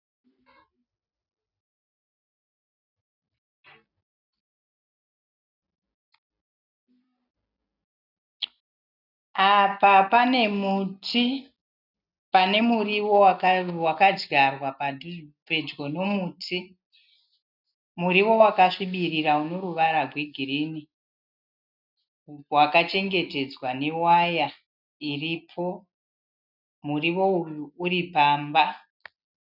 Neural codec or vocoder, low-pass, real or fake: none; 5.4 kHz; real